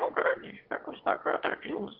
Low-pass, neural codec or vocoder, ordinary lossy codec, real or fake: 5.4 kHz; autoencoder, 22.05 kHz, a latent of 192 numbers a frame, VITS, trained on one speaker; Opus, 16 kbps; fake